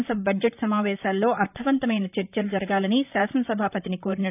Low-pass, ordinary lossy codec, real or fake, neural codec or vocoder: 3.6 kHz; none; fake; vocoder, 44.1 kHz, 128 mel bands, Pupu-Vocoder